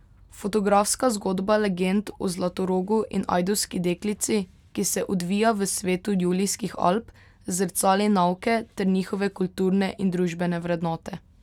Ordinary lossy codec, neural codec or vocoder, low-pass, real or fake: none; none; 19.8 kHz; real